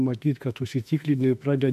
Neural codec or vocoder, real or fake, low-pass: autoencoder, 48 kHz, 32 numbers a frame, DAC-VAE, trained on Japanese speech; fake; 14.4 kHz